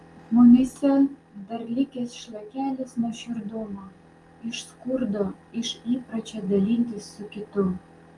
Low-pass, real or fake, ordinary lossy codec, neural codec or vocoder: 10.8 kHz; real; Opus, 32 kbps; none